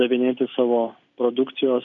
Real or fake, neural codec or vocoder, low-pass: real; none; 7.2 kHz